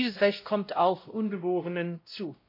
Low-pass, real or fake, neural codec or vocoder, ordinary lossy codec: 5.4 kHz; fake; codec, 16 kHz, 0.5 kbps, X-Codec, WavLM features, trained on Multilingual LibriSpeech; MP3, 32 kbps